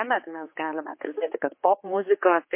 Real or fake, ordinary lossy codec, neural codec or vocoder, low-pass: fake; MP3, 24 kbps; codec, 16 kHz, 4 kbps, X-Codec, HuBERT features, trained on general audio; 3.6 kHz